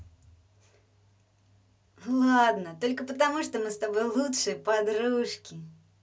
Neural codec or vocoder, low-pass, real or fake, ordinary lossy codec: none; none; real; none